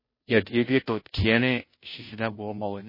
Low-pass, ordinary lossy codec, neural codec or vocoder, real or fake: 5.4 kHz; MP3, 24 kbps; codec, 16 kHz, 0.5 kbps, FunCodec, trained on Chinese and English, 25 frames a second; fake